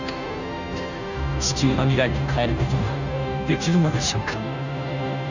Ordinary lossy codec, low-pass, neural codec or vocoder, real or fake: none; 7.2 kHz; codec, 16 kHz, 0.5 kbps, FunCodec, trained on Chinese and English, 25 frames a second; fake